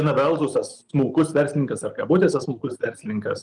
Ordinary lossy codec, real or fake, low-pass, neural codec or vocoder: Opus, 24 kbps; real; 10.8 kHz; none